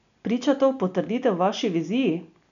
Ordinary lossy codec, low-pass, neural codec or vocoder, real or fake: none; 7.2 kHz; none; real